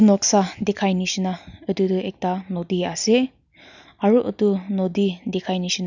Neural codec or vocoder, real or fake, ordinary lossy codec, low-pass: none; real; none; 7.2 kHz